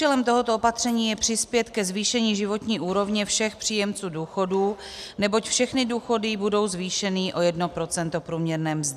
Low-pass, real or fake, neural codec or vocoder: 14.4 kHz; real; none